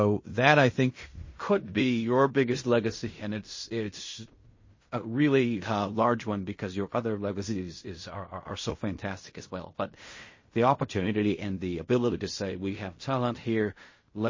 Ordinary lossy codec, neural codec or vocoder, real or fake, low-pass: MP3, 32 kbps; codec, 16 kHz in and 24 kHz out, 0.4 kbps, LongCat-Audio-Codec, fine tuned four codebook decoder; fake; 7.2 kHz